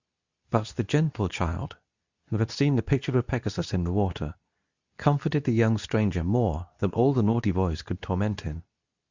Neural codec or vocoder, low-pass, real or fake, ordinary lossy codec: codec, 24 kHz, 0.9 kbps, WavTokenizer, medium speech release version 2; 7.2 kHz; fake; Opus, 64 kbps